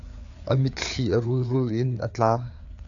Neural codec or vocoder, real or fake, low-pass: codec, 16 kHz, 4 kbps, FreqCodec, larger model; fake; 7.2 kHz